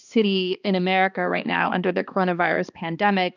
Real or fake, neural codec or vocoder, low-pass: fake; codec, 16 kHz, 2 kbps, X-Codec, HuBERT features, trained on balanced general audio; 7.2 kHz